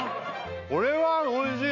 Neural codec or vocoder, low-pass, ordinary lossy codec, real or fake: none; 7.2 kHz; MP3, 64 kbps; real